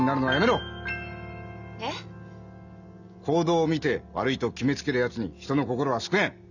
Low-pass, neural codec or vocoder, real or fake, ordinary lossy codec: 7.2 kHz; none; real; none